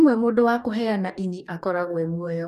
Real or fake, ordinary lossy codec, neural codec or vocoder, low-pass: fake; none; codec, 44.1 kHz, 2.6 kbps, DAC; 14.4 kHz